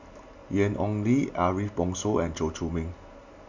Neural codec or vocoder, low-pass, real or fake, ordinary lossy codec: none; 7.2 kHz; real; MP3, 64 kbps